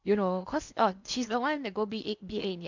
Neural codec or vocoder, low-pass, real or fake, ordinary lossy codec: codec, 16 kHz in and 24 kHz out, 0.8 kbps, FocalCodec, streaming, 65536 codes; 7.2 kHz; fake; MP3, 64 kbps